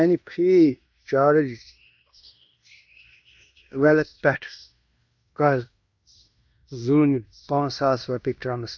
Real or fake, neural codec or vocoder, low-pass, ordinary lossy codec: fake; codec, 16 kHz in and 24 kHz out, 0.9 kbps, LongCat-Audio-Codec, fine tuned four codebook decoder; 7.2 kHz; none